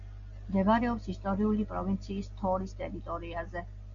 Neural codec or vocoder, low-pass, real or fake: none; 7.2 kHz; real